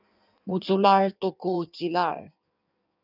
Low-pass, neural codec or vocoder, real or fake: 5.4 kHz; codec, 16 kHz in and 24 kHz out, 1.1 kbps, FireRedTTS-2 codec; fake